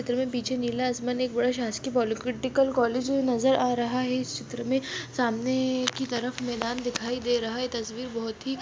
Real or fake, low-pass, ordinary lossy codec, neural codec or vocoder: real; none; none; none